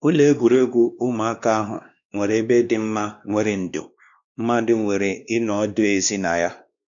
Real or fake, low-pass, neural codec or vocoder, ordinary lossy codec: fake; 7.2 kHz; codec, 16 kHz, 2 kbps, X-Codec, WavLM features, trained on Multilingual LibriSpeech; none